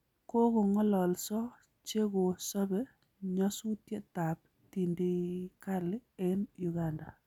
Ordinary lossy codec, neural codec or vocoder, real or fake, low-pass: none; none; real; 19.8 kHz